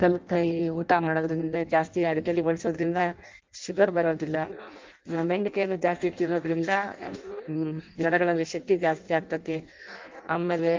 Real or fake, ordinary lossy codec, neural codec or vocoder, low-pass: fake; Opus, 24 kbps; codec, 16 kHz in and 24 kHz out, 0.6 kbps, FireRedTTS-2 codec; 7.2 kHz